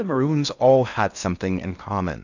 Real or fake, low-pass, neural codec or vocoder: fake; 7.2 kHz; codec, 16 kHz in and 24 kHz out, 0.6 kbps, FocalCodec, streaming, 4096 codes